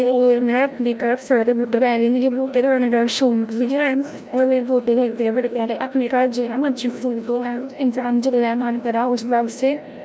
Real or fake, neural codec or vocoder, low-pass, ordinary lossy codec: fake; codec, 16 kHz, 0.5 kbps, FreqCodec, larger model; none; none